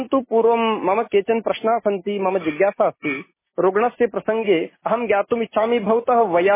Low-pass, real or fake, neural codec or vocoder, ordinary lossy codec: 3.6 kHz; real; none; MP3, 16 kbps